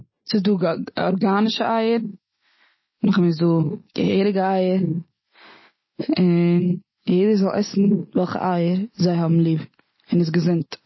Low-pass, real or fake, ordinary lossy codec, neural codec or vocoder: 7.2 kHz; real; MP3, 24 kbps; none